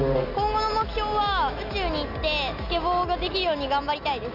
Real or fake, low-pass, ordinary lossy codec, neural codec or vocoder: real; 5.4 kHz; none; none